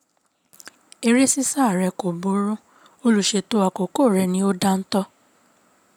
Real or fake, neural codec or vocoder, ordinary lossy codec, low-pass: real; none; none; none